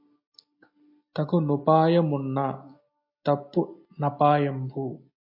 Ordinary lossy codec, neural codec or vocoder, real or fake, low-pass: MP3, 32 kbps; none; real; 5.4 kHz